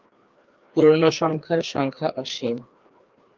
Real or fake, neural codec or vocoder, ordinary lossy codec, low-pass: fake; codec, 16 kHz, 2 kbps, FreqCodec, larger model; Opus, 32 kbps; 7.2 kHz